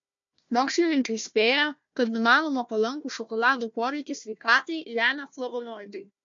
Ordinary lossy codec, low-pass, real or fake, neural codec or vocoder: MP3, 48 kbps; 7.2 kHz; fake; codec, 16 kHz, 1 kbps, FunCodec, trained on Chinese and English, 50 frames a second